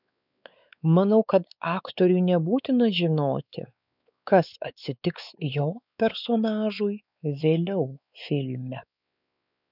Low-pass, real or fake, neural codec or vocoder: 5.4 kHz; fake; codec, 16 kHz, 4 kbps, X-Codec, WavLM features, trained on Multilingual LibriSpeech